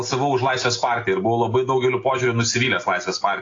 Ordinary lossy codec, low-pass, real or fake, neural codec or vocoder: AAC, 32 kbps; 7.2 kHz; real; none